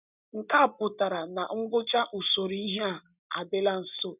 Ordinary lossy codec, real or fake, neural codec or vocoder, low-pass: none; real; none; 3.6 kHz